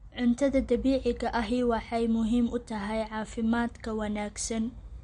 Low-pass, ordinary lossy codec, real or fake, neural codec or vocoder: 19.8 kHz; MP3, 48 kbps; real; none